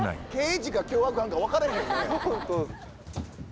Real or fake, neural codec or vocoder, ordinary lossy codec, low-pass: real; none; none; none